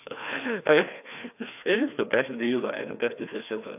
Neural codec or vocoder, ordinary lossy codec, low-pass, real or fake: codec, 16 kHz, 2 kbps, FreqCodec, larger model; AAC, 32 kbps; 3.6 kHz; fake